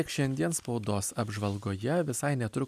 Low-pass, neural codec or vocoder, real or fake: 14.4 kHz; none; real